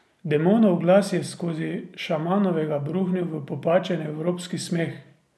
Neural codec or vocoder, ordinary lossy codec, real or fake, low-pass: none; none; real; none